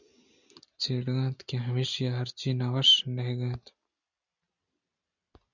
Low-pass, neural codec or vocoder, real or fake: 7.2 kHz; none; real